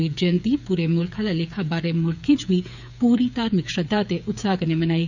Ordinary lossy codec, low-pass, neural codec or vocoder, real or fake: none; 7.2 kHz; codec, 16 kHz, 8 kbps, FreqCodec, smaller model; fake